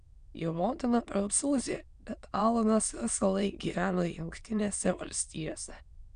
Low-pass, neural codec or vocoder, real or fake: 9.9 kHz; autoencoder, 22.05 kHz, a latent of 192 numbers a frame, VITS, trained on many speakers; fake